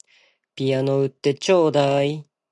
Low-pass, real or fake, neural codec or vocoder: 10.8 kHz; real; none